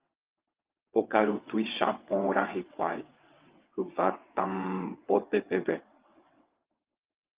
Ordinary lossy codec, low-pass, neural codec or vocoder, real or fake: Opus, 32 kbps; 3.6 kHz; vocoder, 22.05 kHz, 80 mel bands, WaveNeXt; fake